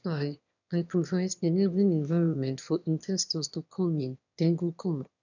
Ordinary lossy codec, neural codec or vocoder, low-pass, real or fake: none; autoencoder, 22.05 kHz, a latent of 192 numbers a frame, VITS, trained on one speaker; 7.2 kHz; fake